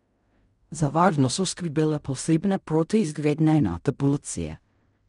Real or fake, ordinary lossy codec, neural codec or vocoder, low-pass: fake; MP3, 96 kbps; codec, 16 kHz in and 24 kHz out, 0.4 kbps, LongCat-Audio-Codec, fine tuned four codebook decoder; 10.8 kHz